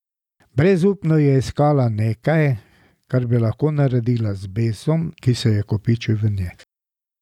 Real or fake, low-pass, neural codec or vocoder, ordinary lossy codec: real; 19.8 kHz; none; none